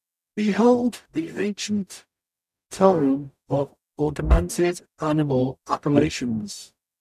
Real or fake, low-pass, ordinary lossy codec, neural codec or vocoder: fake; 14.4 kHz; none; codec, 44.1 kHz, 0.9 kbps, DAC